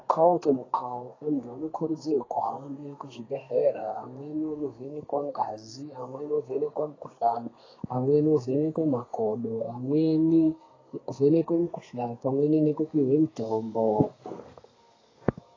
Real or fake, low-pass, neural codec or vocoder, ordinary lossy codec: fake; 7.2 kHz; codec, 32 kHz, 1.9 kbps, SNAC; AAC, 32 kbps